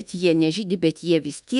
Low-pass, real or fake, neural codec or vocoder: 10.8 kHz; fake; codec, 24 kHz, 1.2 kbps, DualCodec